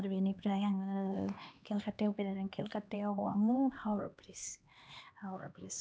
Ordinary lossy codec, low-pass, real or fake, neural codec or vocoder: none; none; fake; codec, 16 kHz, 2 kbps, X-Codec, HuBERT features, trained on LibriSpeech